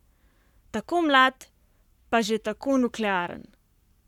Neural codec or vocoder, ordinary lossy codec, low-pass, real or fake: codec, 44.1 kHz, 7.8 kbps, Pupu-Codec; none; 19.8 kHz; fake